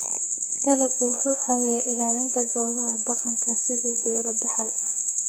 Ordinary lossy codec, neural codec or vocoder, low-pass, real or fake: none; codec, 44.1 kHz, 2.6 kbps, SNAC; none; fake